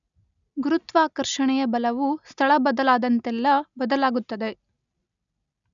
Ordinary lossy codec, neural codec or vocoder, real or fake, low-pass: none; none; real; 7.2 kHz